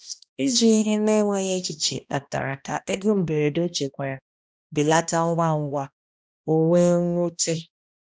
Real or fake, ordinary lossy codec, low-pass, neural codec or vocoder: fake; none; none; codec, 16 kHz, 1 kbps, X-Codec, HuBERT features, trained on balanced general audio